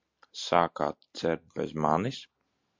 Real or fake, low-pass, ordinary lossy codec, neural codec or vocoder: real; 7.2 kHz; MP3, 48 kbps; none